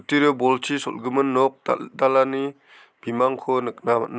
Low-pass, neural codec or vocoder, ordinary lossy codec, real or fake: none; none; none; real